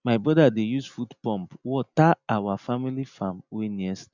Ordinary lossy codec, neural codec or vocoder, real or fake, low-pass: none; none; real; 7.2 kHz